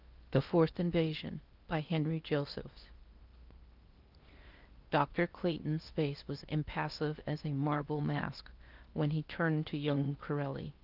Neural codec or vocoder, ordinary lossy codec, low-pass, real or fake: codec, 16 kHz in and 24 kHz out, 0.8 kbps, FocalCodec, streaming, 65536 codes; Opus, 32 kbps; 5.4 kHz; fake